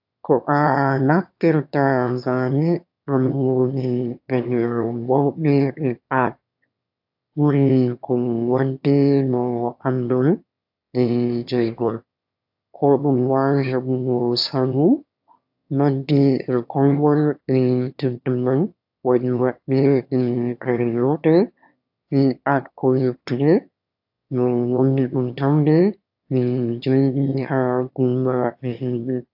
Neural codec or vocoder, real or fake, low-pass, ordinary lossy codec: autoencoder, 22.05 kHz, a latent of 192 numbers a frame, VITS, trained on one speaker; fake; 5.4 kHz; AAC, 48 kbps